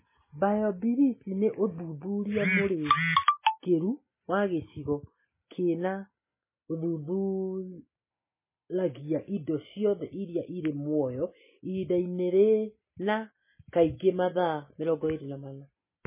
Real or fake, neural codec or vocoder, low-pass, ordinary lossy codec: real; none; 3.6 kHz; MP3, 16 kbps